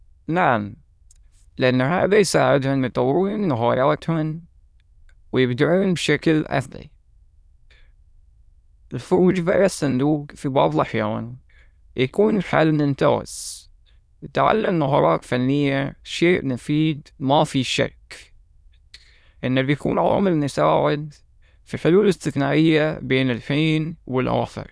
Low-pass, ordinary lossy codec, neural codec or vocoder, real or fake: none; none; autoencoder, 22.05 kHz, a latent of 192 numbers a frame, VITS, trained on many speakers; fake